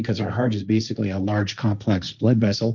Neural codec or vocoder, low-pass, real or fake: codec, 16 kHz, 1.1 kbps, Voila-Tokenizer; 7.2 kHz; fake